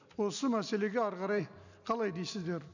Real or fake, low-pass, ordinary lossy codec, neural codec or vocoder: real; 7.2 kHz; none; none